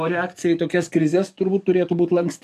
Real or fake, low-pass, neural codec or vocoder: fake; 14.4 kHz; codec, 44.1 kHz, 7.8 kbps, DAC